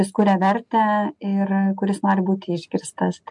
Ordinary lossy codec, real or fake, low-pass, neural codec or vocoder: MP3, 64 kbps; real; 10.8 kHz; none